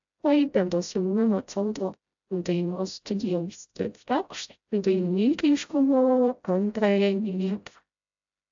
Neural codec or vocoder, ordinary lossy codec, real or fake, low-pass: codec, 16 kHz, 0.5 kbps, FreqCodec, smaller model; AAC, 64 kbps; fake; 7.2 kHz